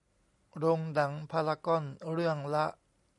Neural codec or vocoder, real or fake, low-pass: none; real; 10.8 kHz